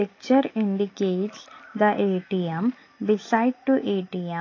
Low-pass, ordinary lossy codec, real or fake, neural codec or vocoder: 7.2 kHz; AAC, 32 kbps; real; none